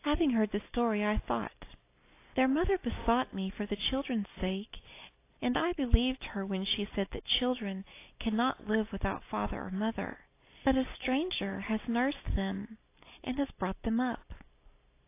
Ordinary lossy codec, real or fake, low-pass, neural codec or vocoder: AAC, 24 kbps; real; 3.6 kHz; none